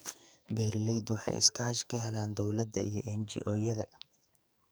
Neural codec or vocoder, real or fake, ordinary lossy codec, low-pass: codec, 44.1 kHz, 2.6 kbps, SNAC; fake; none; none